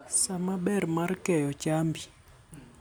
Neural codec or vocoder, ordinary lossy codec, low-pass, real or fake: none; none; none; real